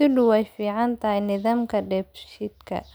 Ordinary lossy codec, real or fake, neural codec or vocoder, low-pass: none; real; none; none